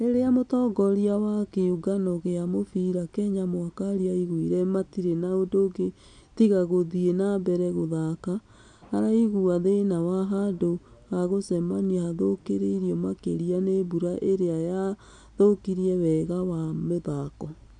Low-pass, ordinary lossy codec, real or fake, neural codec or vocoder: 9.9 kHz; none; real; none